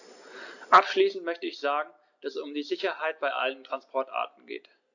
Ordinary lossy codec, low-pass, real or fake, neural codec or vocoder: AAC, 48 kbps; 7.2 kHz; real; none